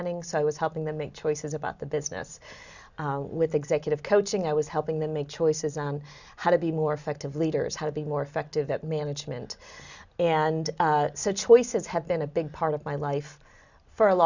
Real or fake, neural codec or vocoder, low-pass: real; none; 7.2 kHz